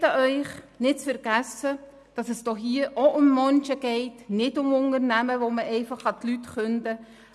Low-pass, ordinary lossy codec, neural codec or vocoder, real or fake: none; none; none; real